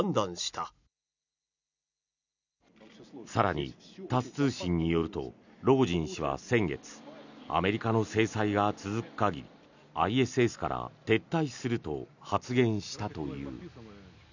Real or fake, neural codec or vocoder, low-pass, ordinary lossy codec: real; none; 7.2 kHz; none